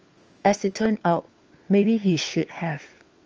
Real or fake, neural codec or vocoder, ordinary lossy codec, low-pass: fake; codec, 16 kHz, 4 kbps, FunCodec, trained on LibriTTS, 50 frames a second; Opus, 24 kbps; 7.2 kHz